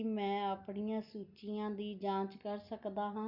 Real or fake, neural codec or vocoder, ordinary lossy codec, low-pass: real; none; Opus, 64 kbps; 5.4 kHz